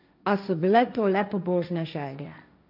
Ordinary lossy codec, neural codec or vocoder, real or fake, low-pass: none; codec, 16 kHz, 1.1 kbps, Voila-Tokenizer; fake; 5.4 kHz